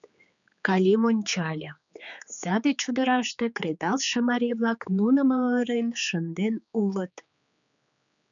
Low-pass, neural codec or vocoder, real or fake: 7.2 kHz; codec, 16 kHz, 4 kbps, X-Codec, HuBERT features, trained on general audio; fake